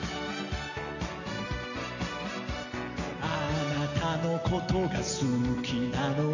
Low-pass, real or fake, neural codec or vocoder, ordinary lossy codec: 7.2 kHz; real; none; none